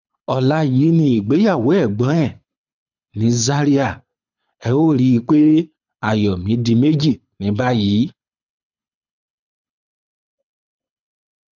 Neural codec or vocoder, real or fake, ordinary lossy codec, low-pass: codec, 24 kHz, 6 kbps, HILCodec; fake; none; 7.2 kHz